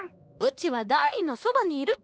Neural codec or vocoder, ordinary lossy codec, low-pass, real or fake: codec, 16 kHz, 2 kbps, X-Codec, HuBERT features, trained on LibriSpeech; none; none; fake